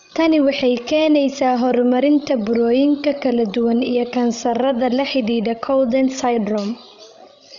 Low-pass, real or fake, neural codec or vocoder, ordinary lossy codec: 7.2 kHz; fake; codec, 16 kHz, 16 kbps, FreqCodec, larger model; none